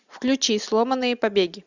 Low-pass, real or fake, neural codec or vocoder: 7.2 kHz; real; none